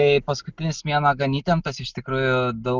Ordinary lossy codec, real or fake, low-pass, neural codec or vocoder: Opus, 24 kbps; real; 7.2 kHz; none